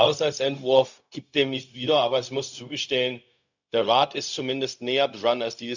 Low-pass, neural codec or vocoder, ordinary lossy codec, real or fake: 7.2 kHz; codec, 16 kHz, 0.4 kbps, LongCat-Audio-Codec; none; fake